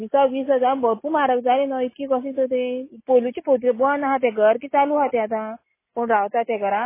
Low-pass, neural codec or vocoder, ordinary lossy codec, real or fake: 3.6 kHz; none; MP3, 16 kbps; real